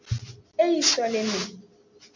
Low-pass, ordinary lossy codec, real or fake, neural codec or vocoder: 7.2 kHz; MP3, 64 kbps; real; none